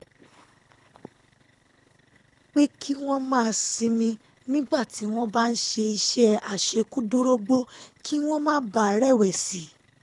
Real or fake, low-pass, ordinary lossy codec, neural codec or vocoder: fake; 10.8 kHz; none; codec, 24 kHz, 3 kbps, HILCodec